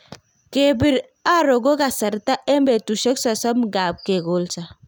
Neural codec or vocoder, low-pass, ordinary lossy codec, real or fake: none; 19.8 kHz; none; real